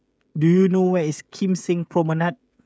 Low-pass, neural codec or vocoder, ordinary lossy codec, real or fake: none; codec, 16 kHz, 16 kbps, FreqCodec, smaller model; none; fake